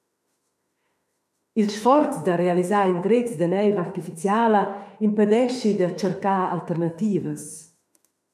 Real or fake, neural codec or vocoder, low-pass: fake; autoencoder, 48 kHz, 32 numbers a frame, DAC-VAE, trained on Japanese speech; 14.4 kHz